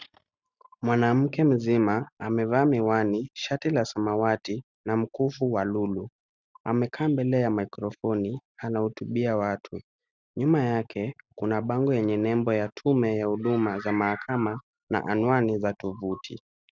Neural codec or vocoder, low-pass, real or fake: none; 7.2 kHz; real